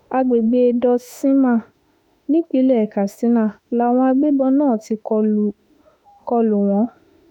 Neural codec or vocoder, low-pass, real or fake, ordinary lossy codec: autoencoder, 48 kHz, 32 numbers a frame, DAC-VAE, trained on Japanese speech; 19.8 kHz; fake; none